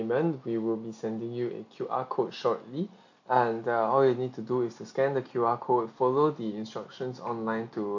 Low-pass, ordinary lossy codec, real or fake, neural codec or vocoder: 7.2 kHz; AAC, 48 kbps; real; none